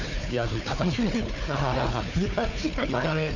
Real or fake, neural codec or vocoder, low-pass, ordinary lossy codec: fake; codec, 16 kHz, 4 kbps, FunCodec, trained on Chinese and English, 50 frames a second; 7.2 kHz; none